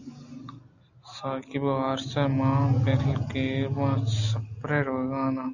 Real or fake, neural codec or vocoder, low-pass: real; none; 7.2 kHz